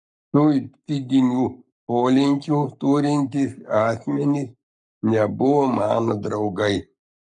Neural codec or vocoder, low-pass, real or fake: codec, 44.1 kHz, 7.8 kbps, Pupu-Codec; 10.8 kHz; fake